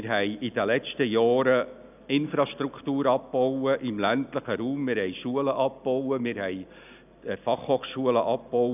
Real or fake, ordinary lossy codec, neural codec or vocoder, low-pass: real; none; none; 3.6 kHz